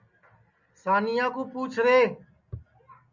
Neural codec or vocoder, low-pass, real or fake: none; 7.2 kHz; real